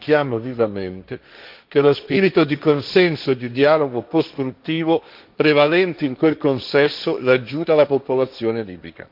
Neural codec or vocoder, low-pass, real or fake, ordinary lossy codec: codec, 16 kHz, 1.1 kbps, Voila-Tokenizer; 5.4 kHz; fake; MP3, 48 kbps